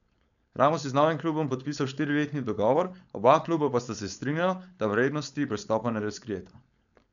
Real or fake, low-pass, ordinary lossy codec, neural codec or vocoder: fake; 7.2 kHz; none; codec, 16 kHz, 4.8 kbps, FACodec